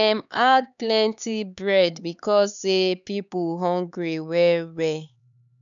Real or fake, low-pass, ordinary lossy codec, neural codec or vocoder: fake; 7.2 kHz; none; codec, 16 kHz, 4 kbps, X-Codec, HuBERT features, trained on LibriSpeech